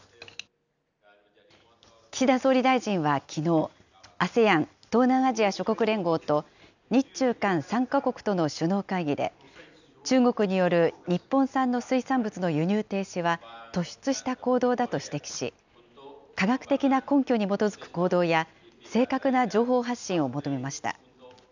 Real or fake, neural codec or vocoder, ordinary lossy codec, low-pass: real; none; none; 7.2 kHz